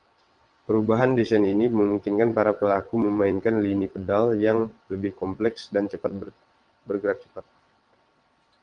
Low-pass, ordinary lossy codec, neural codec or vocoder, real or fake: 9.9 kHz; Opus, 32 kbps; vocoder, 22.05 kHz, 80 mel bands, WaveNeXt; fake